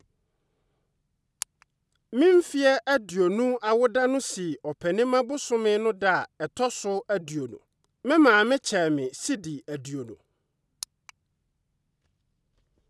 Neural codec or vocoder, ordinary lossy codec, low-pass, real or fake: none; none; none; real